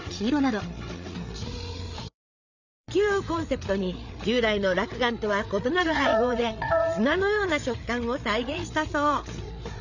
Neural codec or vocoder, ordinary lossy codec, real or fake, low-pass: codec, 16 kHz, 8 kbps, FreqCodec, larger model; none; fake; 7.2 kHz